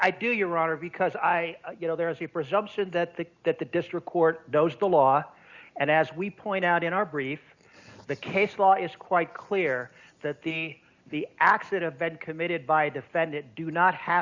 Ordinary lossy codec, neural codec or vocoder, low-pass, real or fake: Opus, 64 kbps; none; 7.2 kHz; real